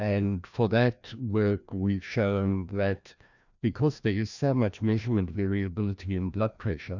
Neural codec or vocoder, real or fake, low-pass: codec, 16 kHz, 1 kbps, FreqCodec, larger model; fake; 7.2 kHz